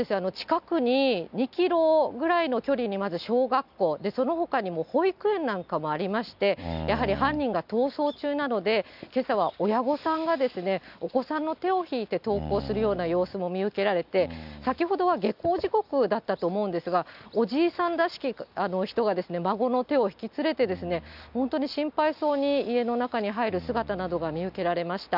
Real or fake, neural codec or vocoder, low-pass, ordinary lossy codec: real; none; 5.4 kHz; none